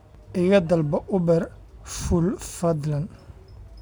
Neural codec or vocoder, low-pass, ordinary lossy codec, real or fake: none; none; none; real